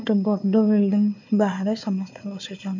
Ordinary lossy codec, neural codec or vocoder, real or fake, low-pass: MP3, 48 kbps; codec, 16 kHz, 4 kbps, FunCodec, trained on Chinese and English, 50 frames a second; fake; 7.2 kHz